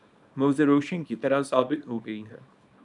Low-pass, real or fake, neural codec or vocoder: 10.8 kHz; fake; codec, 24 kHz, 0.9 kbps, WavTokenizer, small release